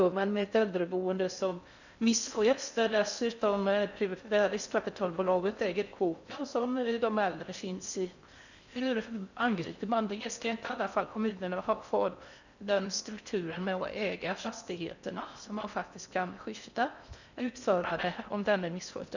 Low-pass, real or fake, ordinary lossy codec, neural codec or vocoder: 7.2 kHz; fake; none; codec, 16 kHz in and 24 kHz out, 0.6 kbps, FocalCodec, streaming, 4096 codes